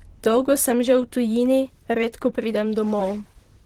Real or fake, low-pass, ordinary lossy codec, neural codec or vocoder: fake; 19.8 kHz; Opus, 16 kbps; vocoder, 44.1 kHz, 128 mel bands, Pupu-Vocoder